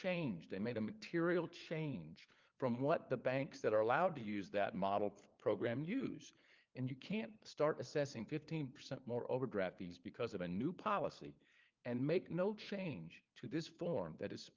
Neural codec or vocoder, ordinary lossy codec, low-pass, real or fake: codec, 16 kHz, 4 kbps, FunCodec, trained on LibriTTS, 50 frames a second; Opus, 32 kbps; 7.2 kHz; fake